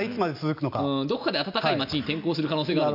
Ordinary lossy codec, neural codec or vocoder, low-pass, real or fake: AAC, 48 kbps; none; 5.4 kHz; real